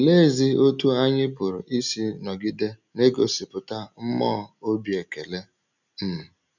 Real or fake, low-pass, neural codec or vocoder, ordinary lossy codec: real; 7.2 kHz; none; none